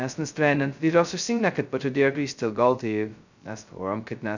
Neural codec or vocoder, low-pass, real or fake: codec, 16 kHz, 0.2 kbps, FocalCodec; 7.2 kHz; fake